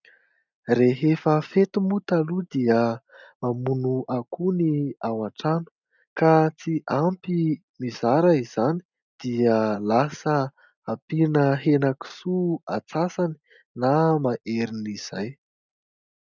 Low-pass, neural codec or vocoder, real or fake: 7.2 kHz; none; real